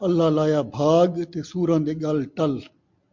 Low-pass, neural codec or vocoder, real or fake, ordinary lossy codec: 7.2 kHz; none; real; MP3, 48 kbps